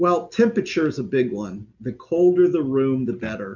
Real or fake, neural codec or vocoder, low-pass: real; none; 7.2 kHz